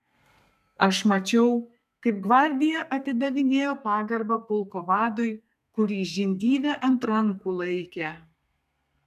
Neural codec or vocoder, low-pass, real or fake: codec, 32 kHz, 1.9 kbps, SNAC; 14.4 kHz; fake